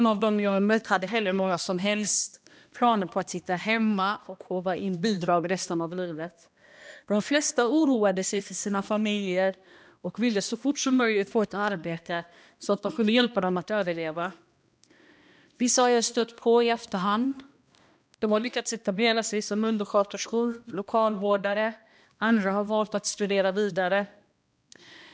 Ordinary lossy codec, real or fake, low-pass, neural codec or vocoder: none; fake; none; codec, 16 kHz, 1 kbps, X-Codec, HuBERT features, trained on balanced general audio